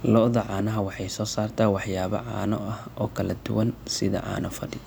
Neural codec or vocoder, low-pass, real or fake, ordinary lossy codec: none; none; real; none